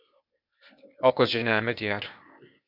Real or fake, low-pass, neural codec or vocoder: fake; 5.4 kHz; codec, 16 kHz, 0.8 kbps, ZipCodec